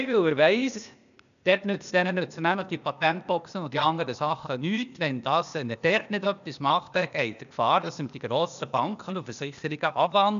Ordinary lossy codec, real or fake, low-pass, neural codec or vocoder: none; fake; 7.2 kHz; codec, 16 kHz, 0.8 kbps, ZipCodec